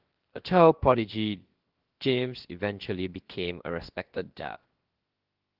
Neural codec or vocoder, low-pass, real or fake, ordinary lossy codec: codec, 16 kHz, about 1 kbps, DyCAST, with the encoder's durations; 5.4 kHz; fake; Opus, 16 kbps